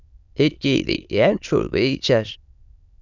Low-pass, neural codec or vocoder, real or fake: 7.2 kHz; autoencoder, 22.05 kHz, a latent of 192 numbers a frame, VITS, trained on many speakers; fake